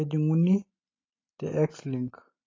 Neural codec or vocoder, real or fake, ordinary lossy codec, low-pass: none; real; AAC, 32 kbps; 7.2 kHz